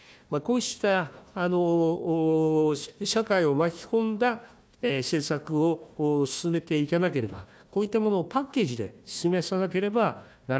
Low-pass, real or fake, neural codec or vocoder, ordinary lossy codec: none; fake; codec, 16 kHz, 1 kbps, FunCodec, trained on Chinese and English, 50 frames a second; none